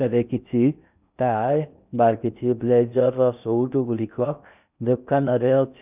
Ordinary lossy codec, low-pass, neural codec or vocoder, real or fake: none; 3.6 kHz; codec, 16 kHz in and 24 kHz out, 0.6 kbps, FocalCodec, streaming, 4096 codes; fake